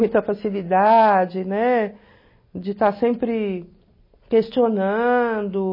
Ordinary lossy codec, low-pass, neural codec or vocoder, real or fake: MP3, 24 kbps; 5.4 kHz; none; real